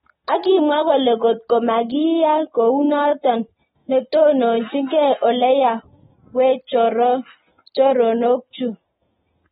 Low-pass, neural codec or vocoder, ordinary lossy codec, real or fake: 19.8 kHz; none; AAC, 16 kbps; real